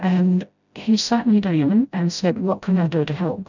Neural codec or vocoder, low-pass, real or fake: codec, 16 kHz, 0.5 kbps, FreqCodec, smaller model; 7.2 kHz; fake